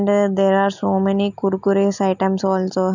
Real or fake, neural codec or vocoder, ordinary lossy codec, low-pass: real; none; none; 7.2 kHz